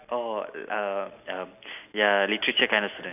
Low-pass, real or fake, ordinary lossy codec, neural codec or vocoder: 3.6 kHz; real; none; none